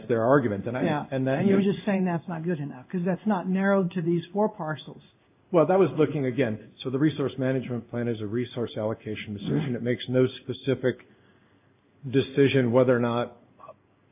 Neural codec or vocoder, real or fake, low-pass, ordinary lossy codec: codec, 16 kHz in and 24 kHz out, 1 kbps, XY-Tokenizer; fake; 3.6 kHz; AAC, 32 kbps